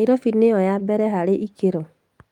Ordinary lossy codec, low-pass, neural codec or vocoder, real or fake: Opus, 32 kbps; 19.8 kHz; none; real